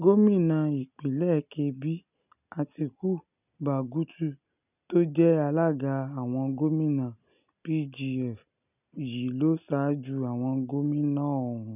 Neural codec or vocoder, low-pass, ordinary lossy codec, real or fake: none; 3.6 kHz; none; real